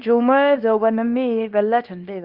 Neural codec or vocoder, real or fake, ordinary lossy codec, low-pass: codec, 24 kHz, 0.9 kbps, WavTokenizer, small release; fake; Opus, 32 kbps; 5.4 kHz